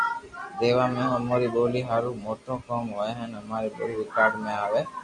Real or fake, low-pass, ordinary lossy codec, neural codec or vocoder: real; 10.8 kHz; MP3, 48 kbps; none